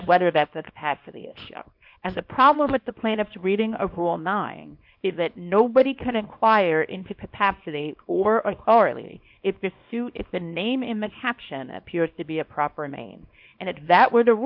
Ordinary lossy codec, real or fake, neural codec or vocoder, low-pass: MP3, 48 kbps; fake; codec, 24 kHz, 0.9 kbps, WavTokenizer, small release; 5.4 kHz